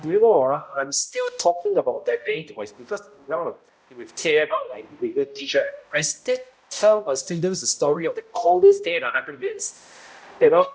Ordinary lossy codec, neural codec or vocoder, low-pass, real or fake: none; codec, 16 kHz, 0.5 kbps, X-Codec, HuBERT features, trained on balanced general audio; none; fake